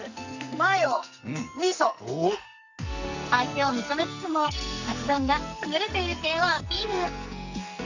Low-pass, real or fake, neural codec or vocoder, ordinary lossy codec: 7.2 kHz; fake; codec, 44.1 kHz, 2.6 kbps, SNAC; none